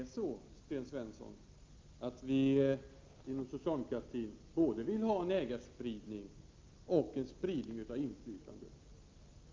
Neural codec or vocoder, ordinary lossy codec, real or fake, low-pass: none; Opus, 24 kbps; real; 7.2 kHz